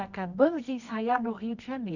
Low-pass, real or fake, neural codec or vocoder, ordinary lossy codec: 7.2 kHz; fake; codec, 24 kHz, 0.9 kbps, WavTokenizer, medium music audio release; none